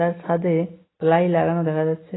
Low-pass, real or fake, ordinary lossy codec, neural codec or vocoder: 7.2 kHz; real; AAC, 16 kbps; none